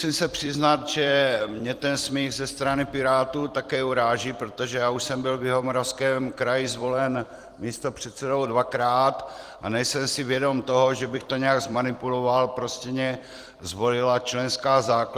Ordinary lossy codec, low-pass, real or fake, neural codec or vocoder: Opus, 24 kbps; 14.4 kHz; fake; vocoder, 44.1 kHz, 128 mel bands every 256 samples, BigVGAN v2